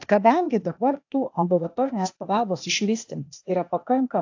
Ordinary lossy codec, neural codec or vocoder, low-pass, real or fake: AAC, 48 kbps; codec, 16 kHz, 0.8 kbps, ZipCodec; 7.2 kHz; fake